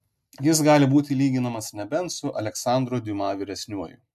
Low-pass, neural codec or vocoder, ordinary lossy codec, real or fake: 14.4 kHz; none; MP3, 96 kbps; real